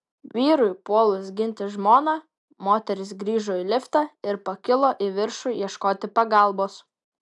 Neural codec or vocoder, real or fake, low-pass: none; real; 10.8 kHz